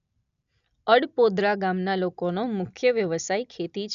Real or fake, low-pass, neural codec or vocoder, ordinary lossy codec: real; 7.2 kHz; none; none